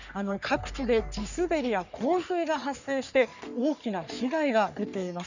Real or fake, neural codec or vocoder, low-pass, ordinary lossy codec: fake; codec, 44.1 kHz, 3.4 kbps, Pupu-Codec; 7.2 kHz; none